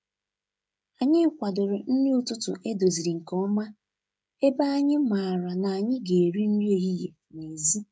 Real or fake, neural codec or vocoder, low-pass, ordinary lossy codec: fake; codec, 16 kHz, 16 kbps, FreqCodec, smaller model; none; none